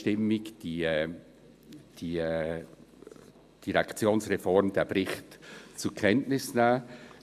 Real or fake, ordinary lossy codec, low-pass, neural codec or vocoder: real; AAC, 96 kbps; 14.4 kHz; none